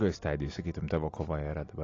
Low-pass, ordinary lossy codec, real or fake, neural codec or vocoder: 7.2 kHz; AAC, 32 kbps; real; none